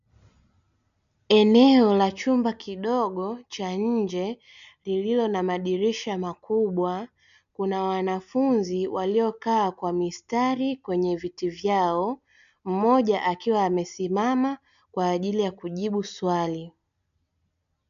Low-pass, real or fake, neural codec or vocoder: 7.2 kHz; real; none